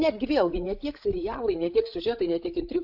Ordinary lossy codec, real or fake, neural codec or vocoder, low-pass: AAC, 48 kbps; fake; codec, 16 kHz, 16 kbps, FreqCodec, larger model; 5.4 kHz